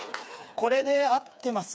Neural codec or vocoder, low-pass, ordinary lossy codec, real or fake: codec, 16 kHz, 4 kbps, FreqCodec, smaller model; none; none; fake